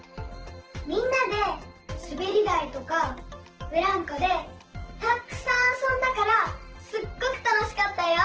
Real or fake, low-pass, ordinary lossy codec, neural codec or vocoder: fake; 7.2 kHz; Opus, 16 kbps; vocoder, 44.1 kHz, 128 mel bands every 512 samples, BigVGAN v2